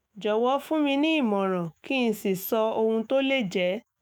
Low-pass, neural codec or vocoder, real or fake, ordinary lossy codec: none; autoencoder, 48 kHz, 128 numbers a frame, DAC-VAE, trained on Japanese speech; fake; none